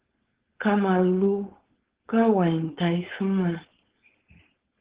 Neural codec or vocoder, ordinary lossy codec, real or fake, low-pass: codec, 16 kHz, 4.8 kbps, FACodec; Opus, 16 kbps; fake; 3.6 kHz